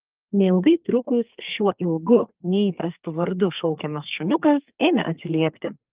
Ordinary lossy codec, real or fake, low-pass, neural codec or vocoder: Opus, 32 kbps; fake; 3.6 kHz; codec, 32 kHz, 1.9 kbps, SNAC